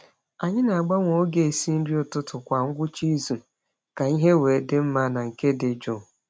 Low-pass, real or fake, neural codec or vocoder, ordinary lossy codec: none; real; none; none